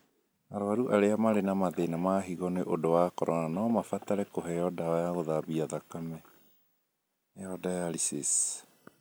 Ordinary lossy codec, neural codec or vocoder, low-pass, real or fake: none; none; none; real